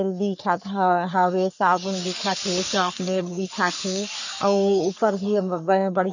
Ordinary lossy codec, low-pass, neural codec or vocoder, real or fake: none; 7.2 kHz; codec, 44.1 kHz, 3.4 kbps, Pupu-Codec; fake